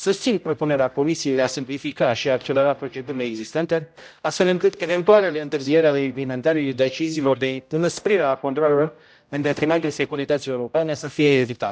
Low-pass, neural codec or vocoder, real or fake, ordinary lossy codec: none; codec, 16 kHz, 0.5 kbps, X-Codec, HuBERT features, trained on general audio; fake; none